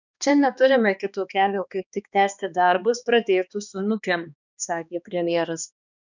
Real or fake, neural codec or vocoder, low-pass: fake; codec, 16 kHz, 2 kbps, X-Codec, HuBERT features, trained on balanced general audio; 7.2 kHz